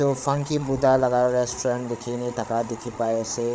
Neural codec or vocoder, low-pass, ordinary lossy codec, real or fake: codec, 16 kHz, 8 kbps, FreqCodec, larger model; none; none; fake